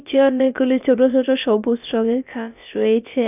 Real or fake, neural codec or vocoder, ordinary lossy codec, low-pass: fake; codec, 16 kHz, about 1 kbps, DyCAST, with the encoder's durations; none; 3.6 kHz